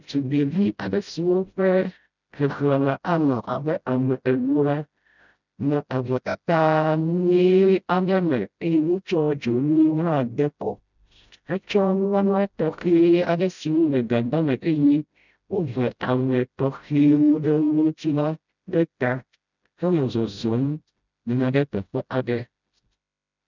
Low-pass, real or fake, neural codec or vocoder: 7.2 kHz; fake; codec, 16 kHz, 0.5 kbps, FreqCodec, smaller model